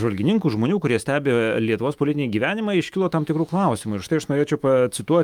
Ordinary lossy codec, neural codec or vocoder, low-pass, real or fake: Opus, 32 kbps; autoencoder, 48 kHz, 128 numbers a frame, DAC-VAE, trained on Japanese speech; 19.8 kHz; fake